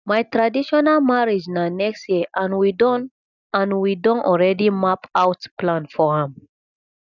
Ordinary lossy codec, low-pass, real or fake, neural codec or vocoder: none; 7.2 kHz; real; none